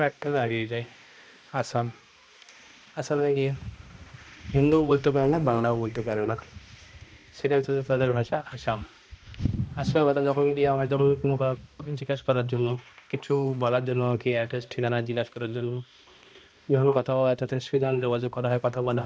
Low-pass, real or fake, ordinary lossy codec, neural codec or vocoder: none; fake; none; codec, 16 kHz, 1 kbps, X-Codec, HuBERT features, trained on balanced general audio